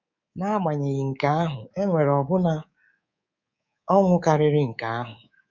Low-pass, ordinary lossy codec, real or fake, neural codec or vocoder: 7.2 kHz; none; fake; codec, 24 kHz, 3.1 kbps, DualCodec